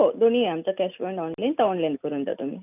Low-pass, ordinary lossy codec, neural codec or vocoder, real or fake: 3.6 kHz; none; none; real